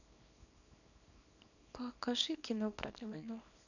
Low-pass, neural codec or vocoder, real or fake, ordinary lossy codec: 7.2 kHz; codec, 24 kHz, 0.9 kbps, WavTokenizer, small release; fake; none